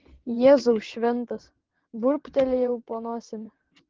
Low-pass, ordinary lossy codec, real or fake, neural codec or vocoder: 7.2 kHz; Opus, 16 kbps; fake; vocoder, 22.05 kHz, 80 mel bands, WaveNeXt